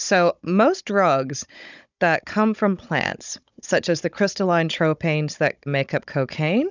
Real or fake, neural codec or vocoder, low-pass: fake; codec, 16 kHz, 16 kbps, FunCodec, trained on Chinese and English, 50 frames a second; 7.2 kHz